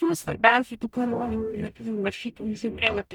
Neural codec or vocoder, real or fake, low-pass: codec, 44.1 kHz, 0.9 kbps, DAC; fake; 19.8 kHz